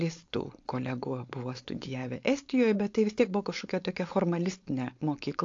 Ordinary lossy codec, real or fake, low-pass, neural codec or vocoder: AAC, 48 kbps; fake; 7.2 kHz; codec, 16 kHz, 4.8 kbps, FACodec